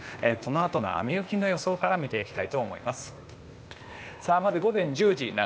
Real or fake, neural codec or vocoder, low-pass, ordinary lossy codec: fake; codec, 16 kHz, 0.8 kbps, ZipCodec; none; none